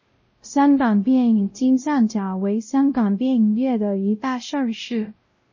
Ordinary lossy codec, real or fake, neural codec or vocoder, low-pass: MP3, 32 kbps; fake; codec, 16 kHz, 0.5 kbps, X-Codec, WavLM features, trained on Multilingual LibriSpeech; 7.2 kHz